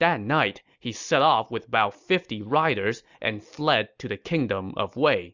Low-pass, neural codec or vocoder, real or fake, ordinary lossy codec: 7.2 kHz; none; real; Opus, 64 kbps